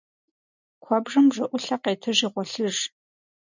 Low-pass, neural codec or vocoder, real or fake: 7.2 kHz; none; real